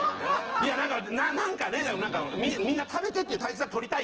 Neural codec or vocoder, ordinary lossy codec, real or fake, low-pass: vocoder, 24 kHz, 100 mel bands, Vocos; Opus, 16 kbps; fake; 7.2 kHz